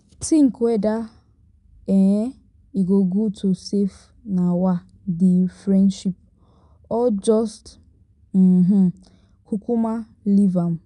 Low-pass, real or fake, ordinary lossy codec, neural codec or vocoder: 10.8 kHz; real; none; none